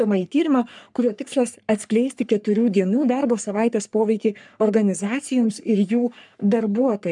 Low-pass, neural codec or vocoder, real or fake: 10.8 kHz; codec, 44.1 kHz, 3.4 kbps, Pupu-Codec; fake